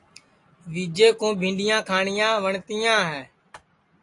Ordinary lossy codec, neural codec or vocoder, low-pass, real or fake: AAC, 48 kbps; none; 10.8 kHz; real